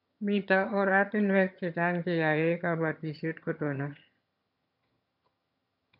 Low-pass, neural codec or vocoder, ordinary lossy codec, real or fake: 5.4 kHz; vocoder, 22.05 kHz, 80 mel bands, HiFi-GAN; MP3, 48 kbps; fake